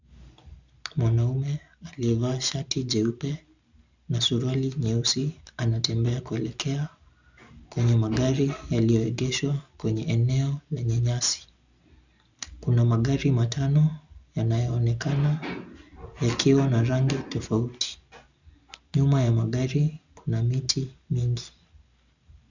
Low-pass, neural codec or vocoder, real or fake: 7.2 kHz; none; real